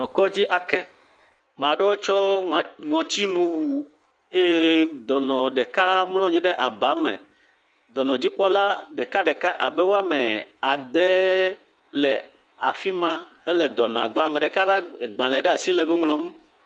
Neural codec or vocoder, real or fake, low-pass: codec, 16 kHz in and 24 kHz out, 1.1 kbps, FireRedTTS-2 codec; fake; 9.9 kHz